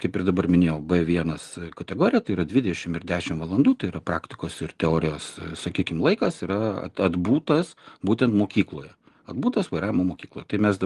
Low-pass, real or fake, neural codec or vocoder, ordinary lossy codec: 10.8 kHz; real; none; Opus, 24 kbps